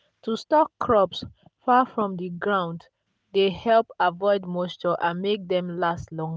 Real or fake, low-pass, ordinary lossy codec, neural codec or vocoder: real; none; none; none